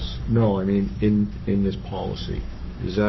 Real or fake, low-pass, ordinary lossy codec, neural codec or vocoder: fake; 7.2 kHz; MP3, 24 kbps; codec, 44.1 kHz, 7.8 kbps, Pupu-Codec